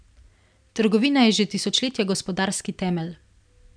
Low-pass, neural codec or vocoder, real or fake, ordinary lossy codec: 9.9 kHz; none; real; none